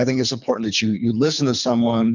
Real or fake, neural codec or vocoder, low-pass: fake; codec, 24 kHz, 3 kbps, HILCodec; 7.2 kHz